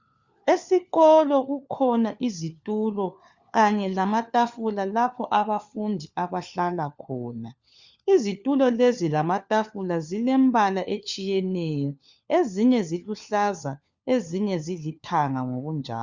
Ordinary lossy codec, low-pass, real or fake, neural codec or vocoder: Opus, 64 kbps; 7.2 kHz; fake; codec, 16 kHz, 4 kbps, FunCodec, trained on LibriTTS, 50 frames a second